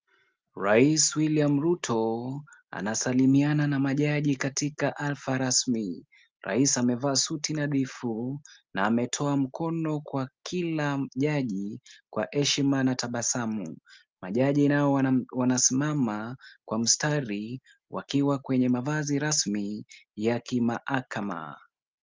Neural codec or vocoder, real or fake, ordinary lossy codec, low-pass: none; real; Opus, 24 kbps; 7.2 kHz